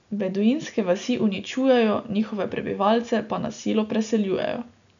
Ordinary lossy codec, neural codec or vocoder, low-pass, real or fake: none; none; 7.2 kHz; real